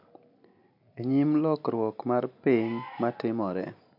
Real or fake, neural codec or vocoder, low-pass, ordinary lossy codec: real; none; 5.4 kHz; none